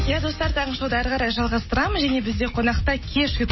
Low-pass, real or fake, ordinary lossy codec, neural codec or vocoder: 7.2 kHz; real; MP3, 24 kbps; none